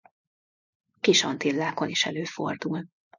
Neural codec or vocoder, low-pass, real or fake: none; 7.2 kHz; real